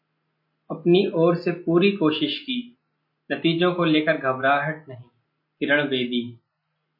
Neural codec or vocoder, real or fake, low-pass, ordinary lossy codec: none; real; 5.4 kHz; MP3, 32 kbps